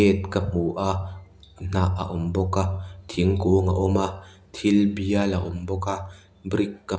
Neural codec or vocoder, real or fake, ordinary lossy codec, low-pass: none; real; none; none